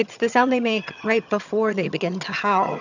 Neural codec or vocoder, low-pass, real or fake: vocoder, 22.05 kHz, 80 mel bands, HiFi-GAN; 7.2 kHz; fake